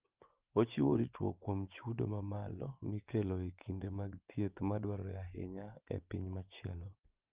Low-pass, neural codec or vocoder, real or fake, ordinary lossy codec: 3.6 kHz; vocoder, 24 kHz, 100 mel bands, Vocos; fake; Opus, 32 kbps